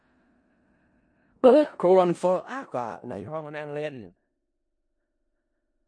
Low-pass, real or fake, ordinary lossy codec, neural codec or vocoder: 9.9 kHz; fake; MP3, 48 kbps; codec, 16 kHz in and 24 kHz out, 0.4 kbps, LongCat-Audio-Codec, four codebook decoder